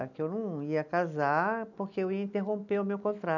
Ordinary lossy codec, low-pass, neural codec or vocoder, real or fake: none; 7.2 kHz; none; real